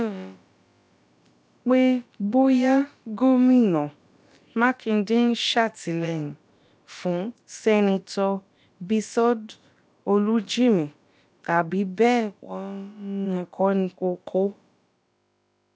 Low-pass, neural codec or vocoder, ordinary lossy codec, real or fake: none; codec, 16 kHz, about 1 kbps, DyCAST, with the encoder's durations; none; fake